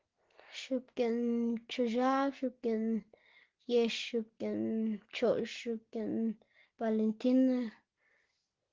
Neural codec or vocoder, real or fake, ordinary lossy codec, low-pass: none; real; Opus, 16 kbps; 7.2 kHz